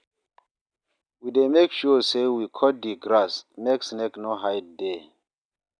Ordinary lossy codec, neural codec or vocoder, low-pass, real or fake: none; none; 9.9 kHz; real